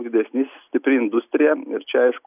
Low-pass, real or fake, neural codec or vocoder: 3.6 kHz; real; none